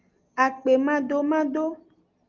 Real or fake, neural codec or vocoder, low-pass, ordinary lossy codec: real; none; 7.2 kHz; Opus, 32 kbps